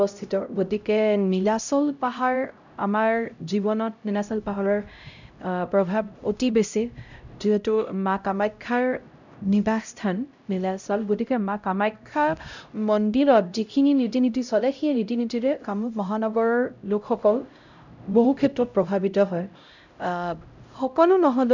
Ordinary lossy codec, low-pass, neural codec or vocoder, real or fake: none; 7.2 kHz; codec, 16 kHz, 0.5 kbps, X-Codec, HuBERT features, trained on LibriSpeech; fake